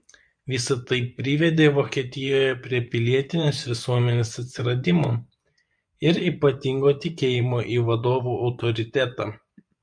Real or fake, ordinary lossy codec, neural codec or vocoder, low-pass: fake; MP3, 64 kbps; vocoder, 24 kHz, 100 mel bands, Vocos; 9.9 kHz